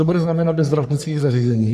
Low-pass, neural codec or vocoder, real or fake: 14.4 kHz; codec, 44.1 kHz, 3.4 kbps, Pupu-Codec; fake